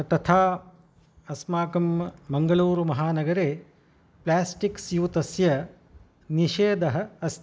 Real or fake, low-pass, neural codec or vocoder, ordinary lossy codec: real; none; none; none